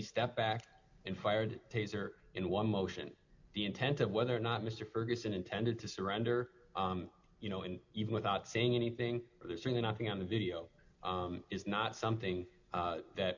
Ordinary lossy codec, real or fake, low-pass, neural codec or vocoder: MP3, 64 kbps; real; 7.2 kHz; none